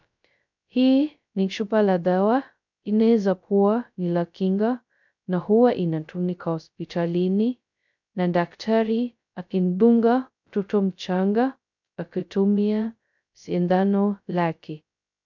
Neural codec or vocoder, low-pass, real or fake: codec, 16 kHz, 0.2 kbps, FocalCodec; 7.2 kHz; fake